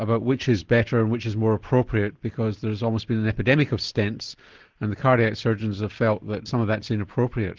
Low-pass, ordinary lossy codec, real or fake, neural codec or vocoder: 7.2 kHz; Opus, 16 kbps; real; none